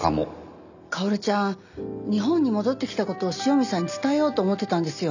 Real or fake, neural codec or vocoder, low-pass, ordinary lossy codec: real; none; 7.2 kHz; none